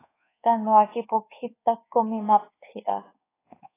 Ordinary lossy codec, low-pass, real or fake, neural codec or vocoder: AAC, 16 kbps; 3.6 kHz; fake; codec, 24 kHz, 1.2 kbps, DualCodec